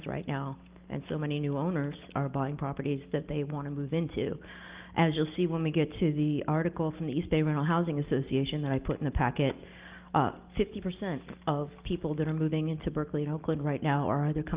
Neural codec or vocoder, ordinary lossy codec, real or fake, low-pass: none; Opus, 32 kbps; real; 3.6 kHz